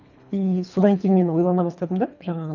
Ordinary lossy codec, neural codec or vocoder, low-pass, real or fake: none; codec, 24 kHz, 3 kbps, HILCodec; 7.2 kHz; fake